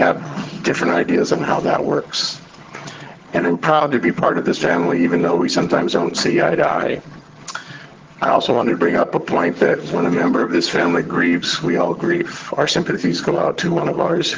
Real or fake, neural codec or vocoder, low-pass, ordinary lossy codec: fake; vocoder, 22.05 kHz, 80 mel bands, HiFi-GAN; 7.2 kHz; Opus, 16 kbps